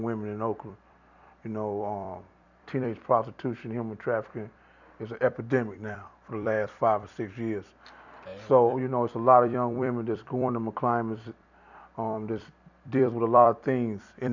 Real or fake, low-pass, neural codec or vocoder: fake; 7.2 kHz; vocoder, 44.1 kHz, 128 mel bands every 256 samples, BigVGAN v2